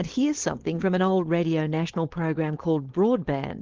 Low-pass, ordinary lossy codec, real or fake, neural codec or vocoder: 7.2 kHz; Opus, 16 kbps; fake; codec, 16 kHz, 4.8 kbps, FACodec